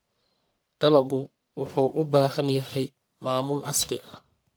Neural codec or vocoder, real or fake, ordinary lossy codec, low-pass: codec, 44.1 kHz, 1.7 kbps, Pupu-Codec; fake; none; none